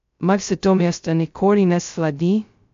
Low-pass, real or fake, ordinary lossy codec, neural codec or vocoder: 7.2 kHz; fake; MP3, 48 kbps; codec, 16 kHz, 0.2 kbps, FocalCodec